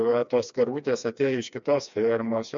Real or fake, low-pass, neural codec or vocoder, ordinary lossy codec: fake; 7.2 kHz; codec, 16 kHz, 2 kbps, FreqCodec, smaller model; MP3, 64 kbps